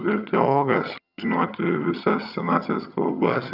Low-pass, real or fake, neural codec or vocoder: 5.4 kHz; fake; vocoder, 22.05 kHz, 80 mel bands, HiFi-GAN